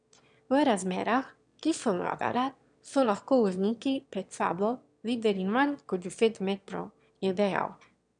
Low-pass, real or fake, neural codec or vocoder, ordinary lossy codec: 9.9 kHz; fake; autoencoder, 22.05 kHz, a latent of 192 numbers a frame, VITS, trained on one speaker; none